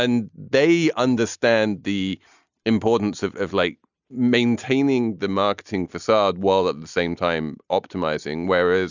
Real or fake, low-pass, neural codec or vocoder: real; 7.2 kHz; none